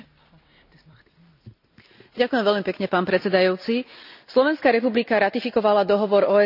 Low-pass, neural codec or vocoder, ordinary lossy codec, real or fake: 5.4 kHz; none; none; real